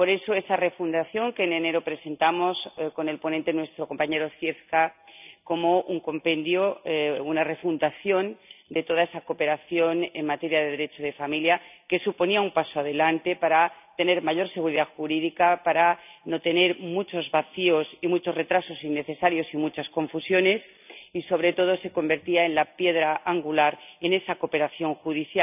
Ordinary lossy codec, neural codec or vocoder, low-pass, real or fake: none; none; 3.6 kHz; real